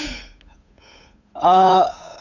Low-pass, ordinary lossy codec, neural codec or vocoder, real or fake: 7.2 kHz; none; codec, 16 kHz, 8 kbps, FunCodec, trained on Chinese and English, 25 frames a second; fake